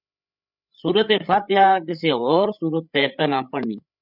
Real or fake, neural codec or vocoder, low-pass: fake; codec, 16 kHz, 8 kbps, FreqCodec, larger model; 5.4 kHz